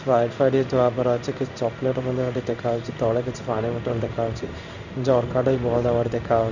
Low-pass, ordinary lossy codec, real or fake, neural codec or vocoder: 7.2 kHz; none; fake; codec, 16 kHz in and 24 kHz out, 1 kbps, XY-Tokenizer